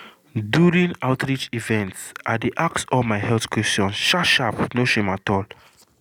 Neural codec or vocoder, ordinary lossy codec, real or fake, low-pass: none; none; real; 19.8 kHz